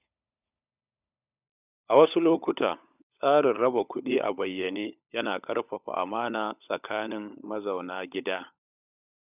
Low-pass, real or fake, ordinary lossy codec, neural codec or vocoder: 3.6 kHz; fake; none; codec, 16 kHz, 16 kbps, FunCodec, trained on LibriTTS, 50 frames a second